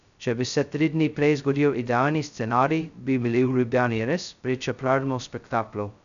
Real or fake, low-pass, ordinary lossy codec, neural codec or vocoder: fake; 7.2 kHz; none; codec, 16 kHz, 0.2 kbps, FocalCodec